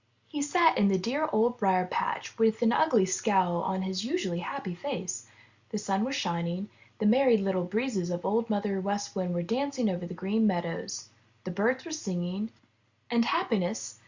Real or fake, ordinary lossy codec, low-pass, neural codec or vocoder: real; Opus, 64 kbps; 7.2 kHz; none